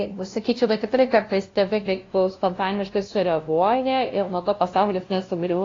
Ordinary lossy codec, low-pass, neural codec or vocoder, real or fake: AAC, 32 kbps; 7.2 kHz; codec, 16 kHz, 0.5 kbps, FunCodec, trained on LibriTTS, 25 frames a second; fake